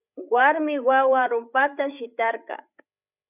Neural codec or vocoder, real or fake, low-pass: codec, 16 kHz, 8 kbps, FreqCodec, larger model; fake; 3.6 kHz